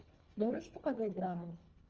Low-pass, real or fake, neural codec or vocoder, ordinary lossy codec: 7.2 kHz; fake; codec, 24 kHz, 1.5 kbps, HILCodec; Opus, 24 kbps